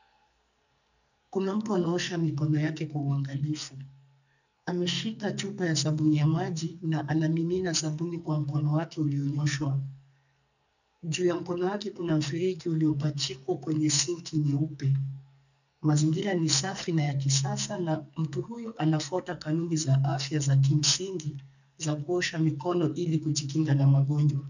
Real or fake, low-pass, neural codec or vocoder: fake; 7.2 kHz; codec, 44.1 kHz, 2.6 kbps, SNAC